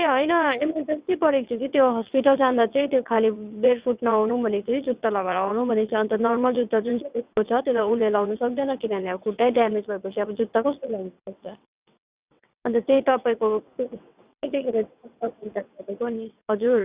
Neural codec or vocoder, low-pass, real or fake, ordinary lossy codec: vocoder, 22.05 kHz, 80 mel bands, WaveNeXt; 3.6 kHz; fake; Opus, 64 kbps